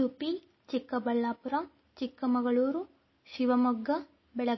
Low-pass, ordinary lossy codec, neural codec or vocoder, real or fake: 7.2 kHz; MP3, 24 kbps; none; real